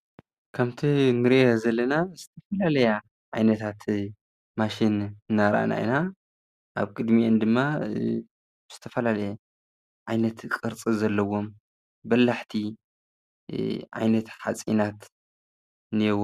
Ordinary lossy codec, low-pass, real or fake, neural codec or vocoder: Opus, 64 kbps; 14.4 kHz; real; none